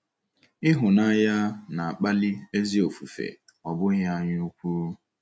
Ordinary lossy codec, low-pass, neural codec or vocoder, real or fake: none; none; none; real